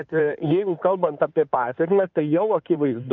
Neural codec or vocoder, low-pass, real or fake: codec, 16 kHz in and 24 kHz out, 2.2 kbps, FireRedTTS-2 codec; 7.2 kHz; fake